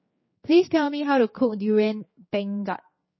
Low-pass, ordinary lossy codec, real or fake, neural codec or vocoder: 7.2 kHz; MP3, 24 kbps; fake; codec, 16 kHz, 4 kbps, X-Codec, HuBERT features, trained on general audio